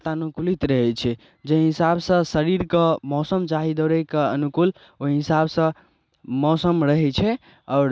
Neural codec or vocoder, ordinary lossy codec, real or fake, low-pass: none; none; real; none